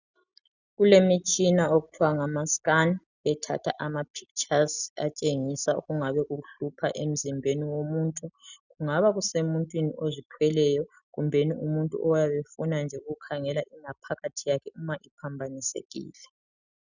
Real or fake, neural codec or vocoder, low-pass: real; none; 7.2 kHz